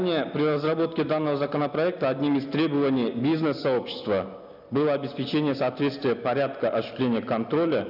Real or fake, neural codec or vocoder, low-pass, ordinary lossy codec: real; none; 5.4 kHz; none